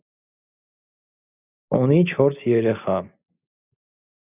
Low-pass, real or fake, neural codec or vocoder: 3.6 kHz; real; none